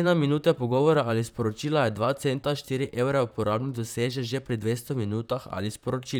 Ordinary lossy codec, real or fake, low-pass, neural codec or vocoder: none; fake; none; vocoder, 44.1 kHz, 128 mel bands, Pupu-Vocoder